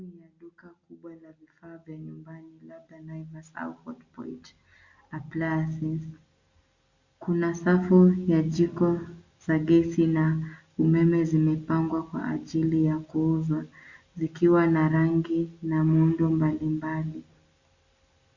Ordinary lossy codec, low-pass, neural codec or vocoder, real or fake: Opus, 64 kbps; 7.2 kHz; none; real